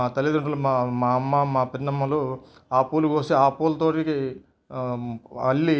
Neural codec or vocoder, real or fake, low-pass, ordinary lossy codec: none; real; none; none